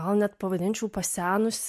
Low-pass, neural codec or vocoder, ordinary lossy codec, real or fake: 14.4 kHz; none; MP3, 64 kbps; real